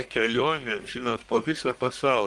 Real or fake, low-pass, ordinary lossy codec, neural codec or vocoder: fake; 10.8 kHz; Opus, 24 kbps; codec, 44.1 kHz, 1.7 kbps, Pupu-Codec